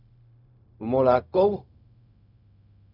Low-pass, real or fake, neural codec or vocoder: 5.4 kHz; fake; codec, 16 kHz, 0.4 kbps, LongCat-Audio-Codec